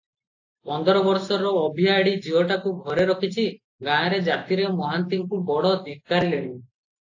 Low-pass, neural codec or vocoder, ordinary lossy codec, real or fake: 7.2 kHz; none; AAC, 48 kbps; real